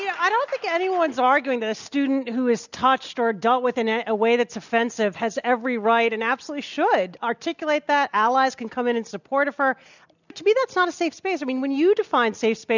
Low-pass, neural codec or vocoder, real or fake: 7.2 kHz; none; real